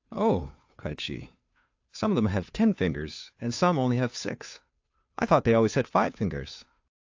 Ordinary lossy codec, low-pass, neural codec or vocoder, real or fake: AAC, 48 kbps; 7.2 kHz; codec, 16 kHz, 2 kbps, FunCodec, trained on Chinese and English, 25 frames a second; fake